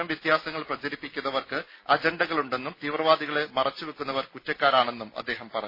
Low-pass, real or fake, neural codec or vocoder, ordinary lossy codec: 5.4 kHz; real; none; MP3, 24 kbps